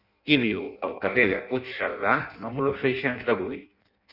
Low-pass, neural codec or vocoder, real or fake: 5.4 kHz; codec, 16 kHz in and 24 kHz out, 0.6 kbps, FireRedTTS-2 codec; fake